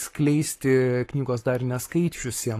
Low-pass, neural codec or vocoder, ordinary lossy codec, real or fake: 14.4 kHz; codec, 44.1 kHz, 7.8 kbps, Pupu-Codec; AAC, 48 kbps; fake